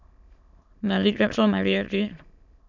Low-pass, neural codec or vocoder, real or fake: 7.2 kHz; autoencoder, 22.05 kHz, a latent of 192 numbers a frame, VITS, trained on many speakers; fake